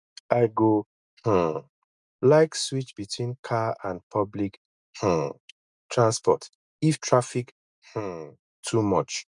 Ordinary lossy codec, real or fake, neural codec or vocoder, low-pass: none; real; none; 10.8 kHz